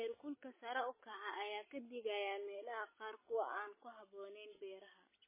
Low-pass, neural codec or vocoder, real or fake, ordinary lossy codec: 3.6 kHz; none; real; MP3, 16 kbps